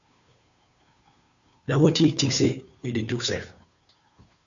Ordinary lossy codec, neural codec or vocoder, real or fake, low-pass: Opus, 64 kbps; codec, 16 kHz, 2 kbps, FunCodec, trained on Chinese and English, 25 frames a second; fake; 7.2 kHz